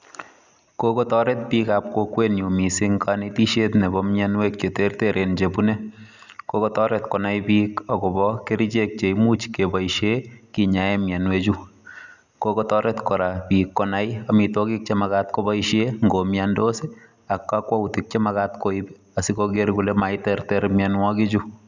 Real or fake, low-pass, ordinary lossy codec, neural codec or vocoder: real; 7.2 kHz; none; none